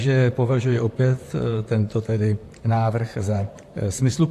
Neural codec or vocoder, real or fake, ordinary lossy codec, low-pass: vocoder, 44.1 kHz, 128 mel bands, Pupu-Vocoder; fake; AAC, 64 kbps; 14.4 kHz